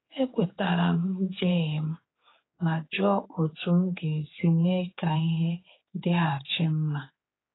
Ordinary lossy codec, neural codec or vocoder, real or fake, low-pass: AAC, 16 kbps; codec, 16 kHz, 4 kbps, X-Codec, HuBERT features, trained on general audio; fake; 7.2 kHz